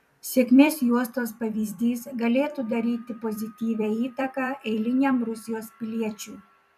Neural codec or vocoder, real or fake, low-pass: vocoder, 44.1 kHz, 128 mel bands every 256 samples, BigVGAN v2; fake; 14.4 kHz